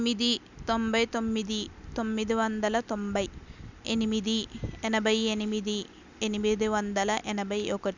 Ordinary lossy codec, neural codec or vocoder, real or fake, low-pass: none; none; real; 7.2 kHz